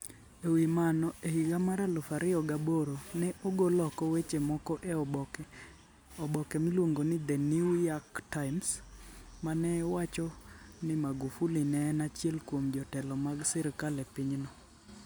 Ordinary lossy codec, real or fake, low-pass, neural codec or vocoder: none; real; none; none